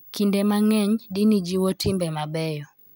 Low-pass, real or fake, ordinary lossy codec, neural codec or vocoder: none; real; none; none